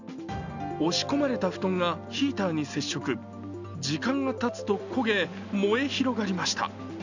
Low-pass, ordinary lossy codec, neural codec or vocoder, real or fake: 7.2 kHz; none; none; real